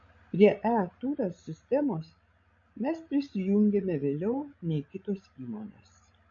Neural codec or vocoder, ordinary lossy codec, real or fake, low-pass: codec, 16 kHz, 16 kbps, FreqCodec, larger model; MP3, 48 kbps; fake; 7.2 kHz